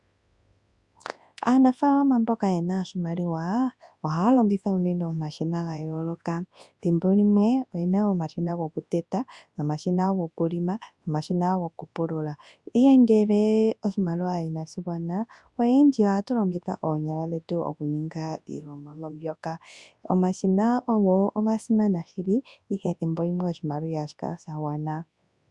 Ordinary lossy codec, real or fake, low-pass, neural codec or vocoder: Opus, 64 kbps; fake; 10.8 kHz; codec, 24 kHz, 0.9 kbps, WavTokenizer, large speech release